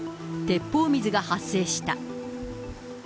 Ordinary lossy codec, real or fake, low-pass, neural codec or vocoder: none; real; none; none